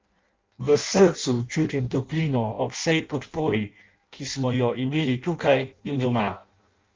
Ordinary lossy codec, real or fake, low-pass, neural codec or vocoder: Opus, 32 kbps; fake; 7.2 kHz; codec, 16 kHz in and 24 kHz out, 0.6 kbps, FireRedTTS-2 codec